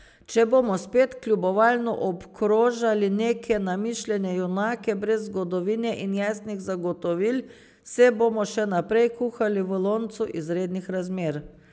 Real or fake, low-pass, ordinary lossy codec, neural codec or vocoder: real; none; none; none